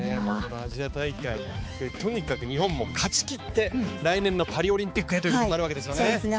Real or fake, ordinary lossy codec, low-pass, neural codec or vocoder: fake; none; none; codec, 16 kHz, 4 kbps, X-Codec, HuBERT features, trained on balanced general audio